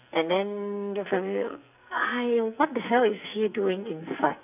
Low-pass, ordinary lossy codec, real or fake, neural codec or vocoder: 3.6 kHz; none; fake; codec, 44.1 kHz, 2.6 kbps, SNAC